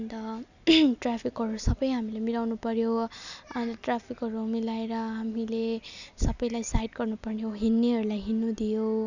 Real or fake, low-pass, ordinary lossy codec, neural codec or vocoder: real; 7.2 kHz; none; none